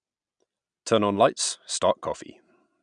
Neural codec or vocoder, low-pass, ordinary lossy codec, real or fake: none; 9.9 kHz; none; real